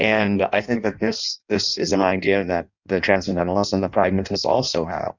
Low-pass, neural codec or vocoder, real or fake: 7.2 kHz; codec, 16 kHz in and 24 kHz out, 0.6 kbps, FireRedTTS-2 codec; fake